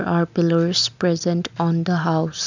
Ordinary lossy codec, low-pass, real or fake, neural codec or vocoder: none; 7.2 kHz; real; none